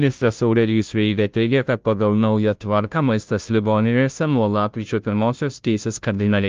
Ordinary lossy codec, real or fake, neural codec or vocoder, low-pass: Opus, 24 kbps; fake; codec, 16 kHz, 0.5 kbps, FunCodec, trained on Chinese and English, 25 frames a second; 7.2 kHz